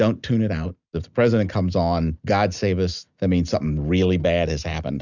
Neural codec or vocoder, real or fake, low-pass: none; real; 7.2 kHz